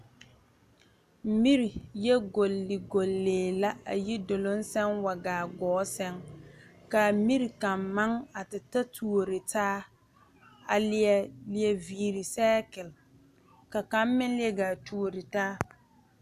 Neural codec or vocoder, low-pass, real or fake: none; 14.4 kHz; real